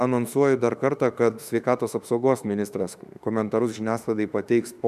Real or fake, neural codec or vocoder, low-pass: fake; autoencoder, 48 kHz, 32 numbers a frame, DAC-VAE, trained on Japanese speech; 14.4 kHz